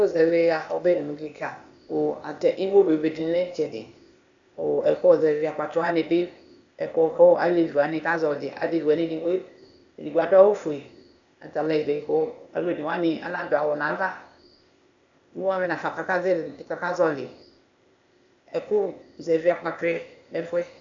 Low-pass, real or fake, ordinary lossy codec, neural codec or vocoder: 7.2 kHz; fake; AAC, 64 kbps; codec, 16 kHz, 0.8 kbps, ZipCodec